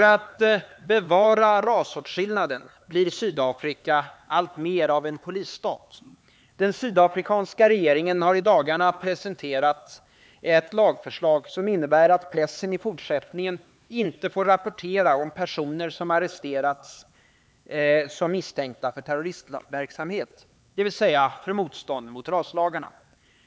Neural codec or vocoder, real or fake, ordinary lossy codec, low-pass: codec, 16 kHz, 4 kbps, X-Codec, HuBERT features, trained on LibriSpeech; fake; none; none